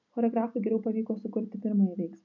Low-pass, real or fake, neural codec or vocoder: 7.2 kHz; real; none